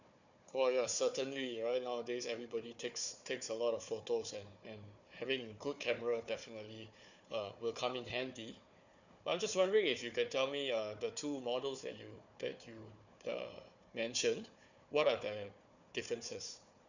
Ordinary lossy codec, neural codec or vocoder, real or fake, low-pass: none; codec, 16 kHz, 4 kbps, FunCodec, trained on Chinese and English, 50 frames a second; fake; 7.2 kHz